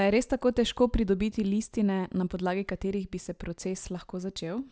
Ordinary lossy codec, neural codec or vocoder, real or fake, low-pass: none; none; real; none